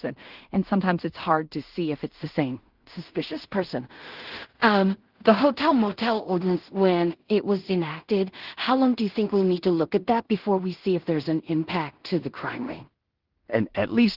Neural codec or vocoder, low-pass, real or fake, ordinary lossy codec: codec, 16 kHz in and 24 kHz out, 0.4 kbps, LongCat-Audio-Codec, two codebook decoder; 5.4 kHz; fake; Opus, 16 kbps